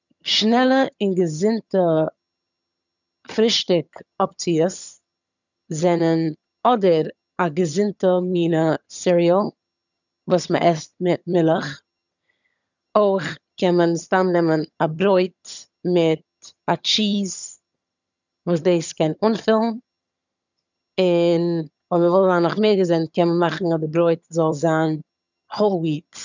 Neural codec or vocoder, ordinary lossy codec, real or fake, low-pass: vocoder, 22.05 kHz, 80 mel bands, HiFi-GAN; none; fake; 7.2 kHz